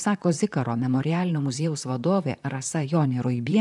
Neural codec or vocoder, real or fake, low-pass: vocoder, 44.1 kHz, 128 mel bands, Pupu-Vocoder; fake; 10.8 kHz